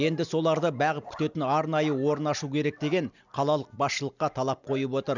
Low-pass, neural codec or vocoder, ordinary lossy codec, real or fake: 7.2 kHz; none; none; real